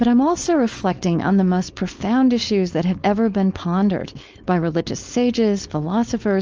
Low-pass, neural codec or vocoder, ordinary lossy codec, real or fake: 7.2 kHz; codec, 16 kHz, 4.8 kbps, FACodec; Opus, 24 kbps; fake